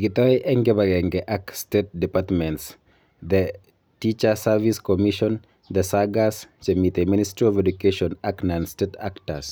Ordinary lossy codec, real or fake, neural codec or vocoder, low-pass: none; real; none; none